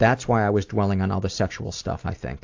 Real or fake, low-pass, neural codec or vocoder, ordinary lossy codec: real; 7.2 kHz; none; AAC, 48 kbps